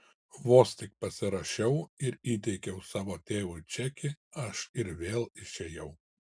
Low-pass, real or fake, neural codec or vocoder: 9.9 kHz; real; none